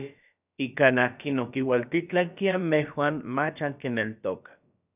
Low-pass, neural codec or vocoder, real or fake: 3.6 kHz; codec, 16 kHz, about 1 kbps, DyCAST, with the encoder's durations; fake